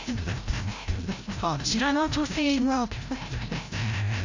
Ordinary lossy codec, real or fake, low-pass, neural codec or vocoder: AAC, 48 kbps; fake; 7.2 kHz; codec, 16 kHz, 0.5 kbps, FreqCodec, larger model